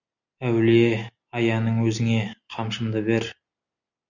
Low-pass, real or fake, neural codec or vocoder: 7.2 kHz; real; none